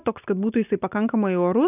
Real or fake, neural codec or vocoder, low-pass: real; none; 3.6 kHz